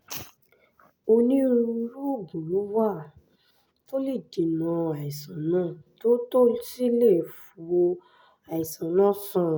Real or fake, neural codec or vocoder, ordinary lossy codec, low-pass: real; none; none; none